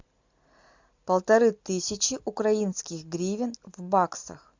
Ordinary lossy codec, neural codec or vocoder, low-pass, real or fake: MP3, 64 kbps; none; 7.2 kHz; real